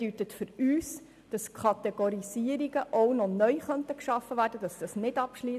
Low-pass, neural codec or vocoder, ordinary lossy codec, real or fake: 14.4 kHz; none; none; real